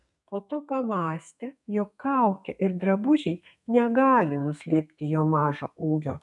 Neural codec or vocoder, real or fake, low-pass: codec, 32 kHz, 1.9 kbps, SNAC; fake; 10.8 kHz